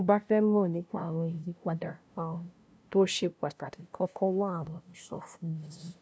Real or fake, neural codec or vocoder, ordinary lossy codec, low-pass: fake; codec, 16 kHz, 0.5 kbps, FunCodec, trained on LibriTTS, 25 frames a second; none; none